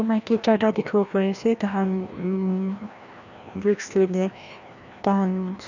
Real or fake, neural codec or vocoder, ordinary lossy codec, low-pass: fake; codec, 16 kHz, 1 kbps, FreqCodec, larger model; none; 7.2 kHz